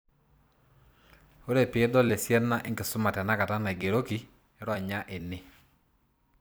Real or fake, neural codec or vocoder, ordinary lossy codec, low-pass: fake; vocoder, 44.1 kHz, 128 mel bands every 256 samples, BigVGAN v2; none; none